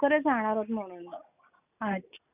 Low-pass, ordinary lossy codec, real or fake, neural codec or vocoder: 3.6 kHz; none; real; none